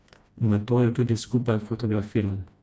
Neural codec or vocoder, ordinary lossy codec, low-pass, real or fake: codec, 16 kHz, 1 kbps, FreqCodec, smaller model; none; none; fake